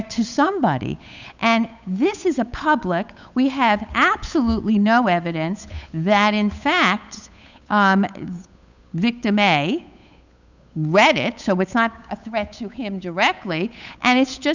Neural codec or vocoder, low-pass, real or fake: codec, 16 kHz, 8 kbps, FunCodec, trained on Chinese and English, 25 frames a second; 7.2 kHz; fake